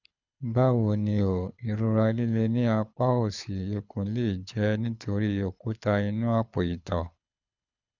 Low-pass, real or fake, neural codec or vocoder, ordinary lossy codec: 7.2 kHz; fake; codec, 24 kHz, 6 kbps, HILCodec; none